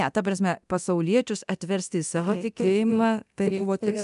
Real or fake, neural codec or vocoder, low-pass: fake; codec, 24 kHz, 0.9 kbps, DualCodec; 10.8 kHz